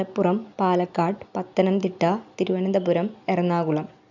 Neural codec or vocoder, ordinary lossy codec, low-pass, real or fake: none; none; 7.2 kHz; real